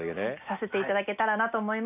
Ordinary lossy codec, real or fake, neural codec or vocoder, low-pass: none; real; none; 3.6 kHz